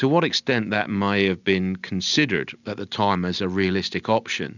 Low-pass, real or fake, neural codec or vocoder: 7.2 kHz; real; none